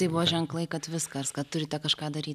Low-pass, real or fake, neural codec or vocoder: 14.4 kHz; real; none